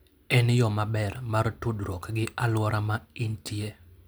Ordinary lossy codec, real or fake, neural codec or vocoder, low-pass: none; real; none; none